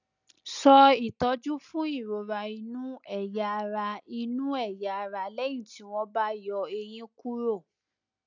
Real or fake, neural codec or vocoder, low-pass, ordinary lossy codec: real; none; 7.2 kHz; none